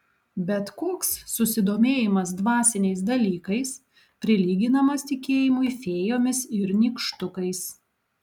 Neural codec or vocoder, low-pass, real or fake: none; 19.8 kHz; real